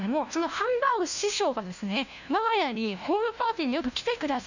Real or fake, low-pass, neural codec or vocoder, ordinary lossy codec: fake; 7.2 kHz; codec, 16 kHz, 1 kbps, FunCodec, trained on LibriTTS, 50 frames a second; none